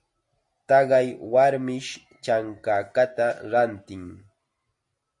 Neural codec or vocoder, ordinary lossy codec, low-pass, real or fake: none; MP3, 48 kbps; 10.8 kHz; real